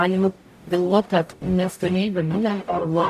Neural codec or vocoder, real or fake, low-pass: codec, 44.1 kHz, 0.9 kbps, DAC; fake; 14.4 kHz